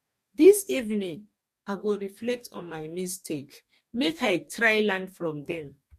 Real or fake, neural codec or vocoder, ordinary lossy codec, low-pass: fake; codec, 44.1 kHz, 2.6 kbps, DAC; MP3, 64 kbps; 14.4 kHz